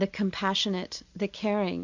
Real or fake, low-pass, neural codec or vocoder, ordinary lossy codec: real; 7.2 kHz; none; MP3, 64 kbps